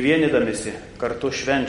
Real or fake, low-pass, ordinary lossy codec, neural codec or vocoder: real; 10.8 kHz; MP3, 48 kbps; none